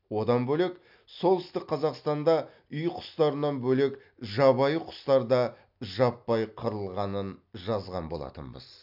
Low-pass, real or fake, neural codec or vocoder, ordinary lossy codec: 5.4 kHz; real; none; AAC, 48 kbps